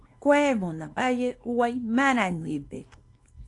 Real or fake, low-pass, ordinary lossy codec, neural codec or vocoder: fake; 10.8 kHz; AAC, 48 kbps; codec, 24 kHz, 0.9 kbps, WavTokenizer, small release